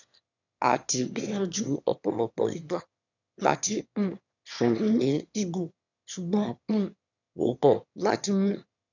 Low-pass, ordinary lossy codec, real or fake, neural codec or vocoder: 7.2 kHz; none; fake; autoencoder, 22.05 kHz, a latent of 192 numbers a frame, VITS, trained on one speaker